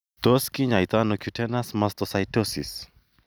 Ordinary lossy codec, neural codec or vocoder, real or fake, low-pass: none; none; real; none